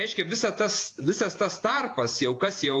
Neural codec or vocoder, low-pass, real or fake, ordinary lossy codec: vocoder, 48 kHz, 128 mel bands, Vocos; 10.8 kHz; fake; AAC, 48 kbps